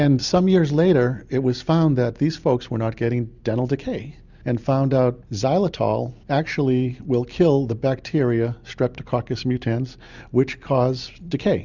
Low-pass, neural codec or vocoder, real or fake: 7.2 kHz; none; real